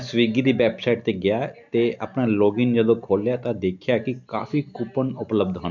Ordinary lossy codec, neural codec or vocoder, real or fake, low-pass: none; none; real; 7.2 kHz